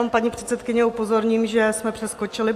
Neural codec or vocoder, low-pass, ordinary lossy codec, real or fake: none; 14.4 kHz; AAC, 64 kbps; real